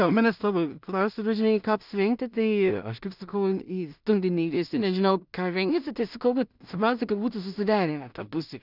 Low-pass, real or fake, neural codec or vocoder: 5.4 kHz; fake; codec, 16 kHz in and 24 kHz out, 0.4 kbps, LongCat-Audio-Codec, two codebook decoder